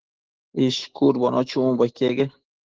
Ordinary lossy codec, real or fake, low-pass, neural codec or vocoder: Opus, 16 kbps; fake; 7.2 kHz; autoencoder, 48 kHz, 128 numbers a frame, DAC-VAE, trained on Japanese speech